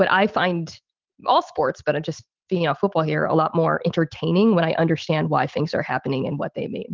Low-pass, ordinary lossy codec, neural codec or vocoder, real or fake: 7.2 kHz; Opus, 32 kbps; none; real